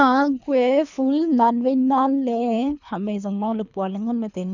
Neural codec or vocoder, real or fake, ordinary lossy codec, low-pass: codec, 24 kHz, 3 kbps, HILCodec; fake; none; 7.2 kHz